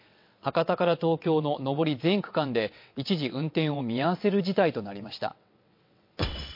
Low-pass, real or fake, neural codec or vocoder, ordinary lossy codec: 5.4 kHz; fake; vocoder, 22.05 kHz, 80 mel bands, WaveNeXt; MP3, 32 kbps